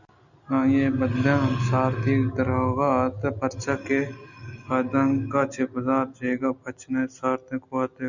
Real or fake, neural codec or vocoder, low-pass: real; none; 7.2 kHz